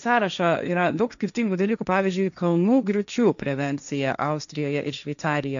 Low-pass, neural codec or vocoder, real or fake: 7.2 kHz; codec, 16 kHz, 1.1 kbps, Voila-Tokenizer; fake